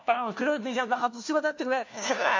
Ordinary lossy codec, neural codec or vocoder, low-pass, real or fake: AAC, 48 kbps; codec, 16 kHz, 1 kbps, FunCodec, trained on LibriTTS, 50 frames a second; 7.2 kHz; fake